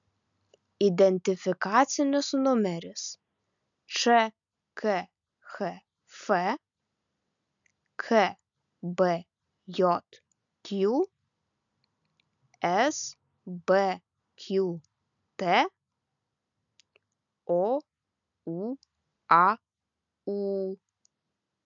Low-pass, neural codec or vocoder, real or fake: 7.2 kHz; none; real